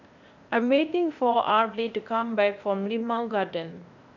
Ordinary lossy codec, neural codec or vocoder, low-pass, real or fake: none; codec, 16 kHz, 0.8 kbps, ZipCodec; 7.2 kHz; fake